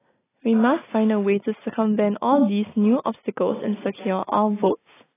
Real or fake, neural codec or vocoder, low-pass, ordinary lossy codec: real; none; 3.6 kHz; AAC, 16 kbps